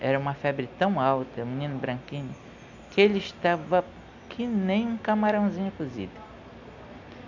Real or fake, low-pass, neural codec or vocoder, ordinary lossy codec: real; 7.2 kHz; none; none